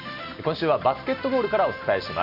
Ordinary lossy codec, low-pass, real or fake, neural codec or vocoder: none; 5.4 kHz; real; none